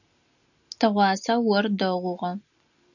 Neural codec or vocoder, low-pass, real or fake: none; 7.2 kHz; real